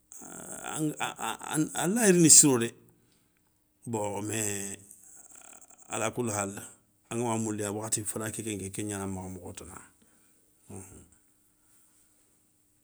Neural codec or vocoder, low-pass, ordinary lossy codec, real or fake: none; none; none; real